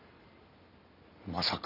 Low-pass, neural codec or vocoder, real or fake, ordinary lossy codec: 5.4 kHz; none; real; none